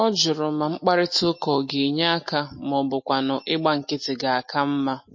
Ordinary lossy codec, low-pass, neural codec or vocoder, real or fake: MP3, 32 kbps; 7.2 kHz; none; real